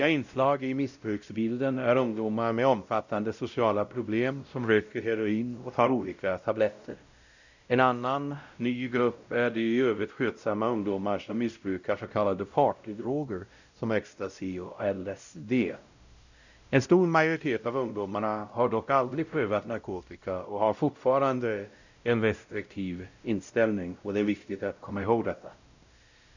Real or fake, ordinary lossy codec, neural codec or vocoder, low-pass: fake; none; codec, 16 kHz, 0.5 kbps, X-Codec, WavLM features, trained on Multilingual LibriSpeech; 7.2 kHz